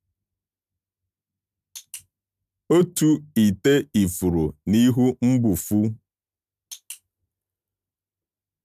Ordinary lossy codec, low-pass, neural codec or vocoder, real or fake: none; 14.4 kHz; none; real